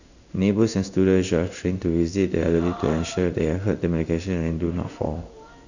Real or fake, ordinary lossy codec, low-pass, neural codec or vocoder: fake; none; 7.2 kHz; codec, 16 kHz in and 24 kHz out, 1 kbps, XY-Tokenizer